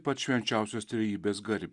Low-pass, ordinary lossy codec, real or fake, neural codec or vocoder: 10.8 kHz; Opus, 64 kbps; real; none